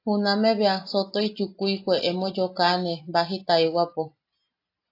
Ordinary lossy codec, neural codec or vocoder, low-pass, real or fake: AAC, 32 kbps; none; 5.4 kHz; real